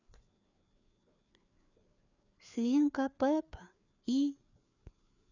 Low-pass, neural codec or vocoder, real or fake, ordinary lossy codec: 7.2 kHz; codec, 16 kHz, 4 kbps, FreqCodec, larger model; fake; none